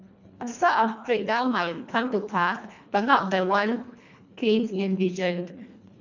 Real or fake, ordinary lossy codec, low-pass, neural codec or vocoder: fake; none; 7.2 kHz; codec, 24 kHz, 1.5 kbps, HILCodec